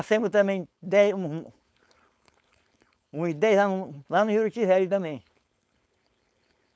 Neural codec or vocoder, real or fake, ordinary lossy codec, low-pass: codec, 16 kHz, 4.8 kbps, FACodec; fake; none; none